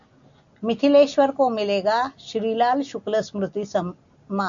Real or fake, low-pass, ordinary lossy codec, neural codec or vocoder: real; 7.2 kHz; MP3, 64 kbps; none